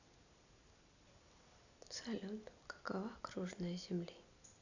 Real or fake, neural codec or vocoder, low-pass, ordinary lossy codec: real; none; 7.2 kHz; none